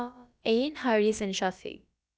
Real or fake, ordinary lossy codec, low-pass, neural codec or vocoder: fake; none; none; codec, 16 kHz, about 1 kbps, DyCAST, with the encoder's durations